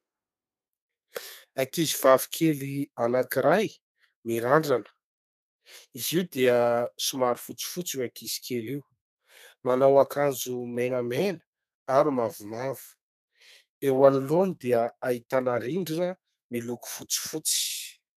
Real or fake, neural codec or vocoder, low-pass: fake; codec, 32 kHz, 1.9 kbps, SNAC; 14.4 kHz